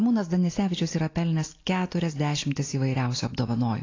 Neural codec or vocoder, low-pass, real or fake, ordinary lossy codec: none; 7.2 kHz; real; AAC, 32 kbps